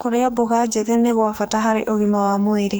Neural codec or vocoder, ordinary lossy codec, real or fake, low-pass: codec, 44.1 kHz, 2.6 kbps, SNAC; none; fake; none